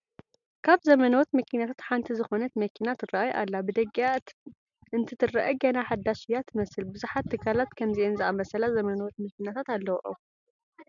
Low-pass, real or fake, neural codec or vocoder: 7.2 kHz; real; none